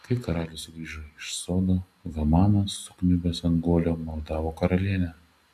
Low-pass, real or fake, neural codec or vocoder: 14.4 kHz; real; none